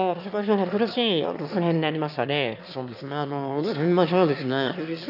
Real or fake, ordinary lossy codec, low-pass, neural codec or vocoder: fake; AAC, 48 kbps; 5.4 kHz; autoencoder, 22.05 kHz, a latent of 192 numbers a frame, VITS, trained on one speaker